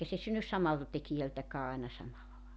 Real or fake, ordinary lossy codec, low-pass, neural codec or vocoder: real; none; none; none